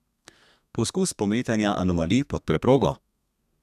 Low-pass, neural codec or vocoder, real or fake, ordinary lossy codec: 14.4 kHz; codec, 32 kHz, 1.9 kbps, SNAC; fake; none